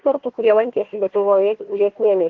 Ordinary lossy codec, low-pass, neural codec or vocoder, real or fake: Opus, 16 kbps; 7.2 kHz; codec, 16 kHz, 1 kbps, FunCodec, trained on Chinese and English, 50 frames a second; fake